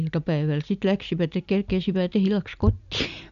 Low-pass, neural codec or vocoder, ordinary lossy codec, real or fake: 7.2 kHz; none; none; real